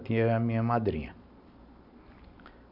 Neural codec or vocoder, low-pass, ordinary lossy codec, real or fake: none; 5.4 kHz; none; real